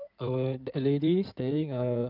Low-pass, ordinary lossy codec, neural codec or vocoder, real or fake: 5.4 kHz; none; codec, 16 kHz in and 24 kHz out, 2.2 kbps, FireRedTTS-2 codec; fake